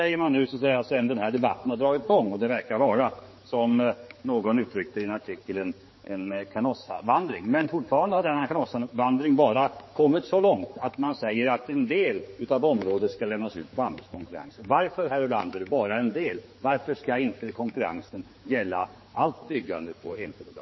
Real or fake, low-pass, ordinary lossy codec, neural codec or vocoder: fake; 7.2 kHz; MP3, 24 kbps; codec, 16 kHz, 4 kbps, X-Codec, HuBERT features, trained on general audio